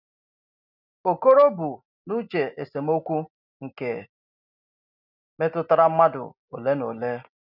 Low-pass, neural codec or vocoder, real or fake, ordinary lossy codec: 5.4 kHz; none; real; none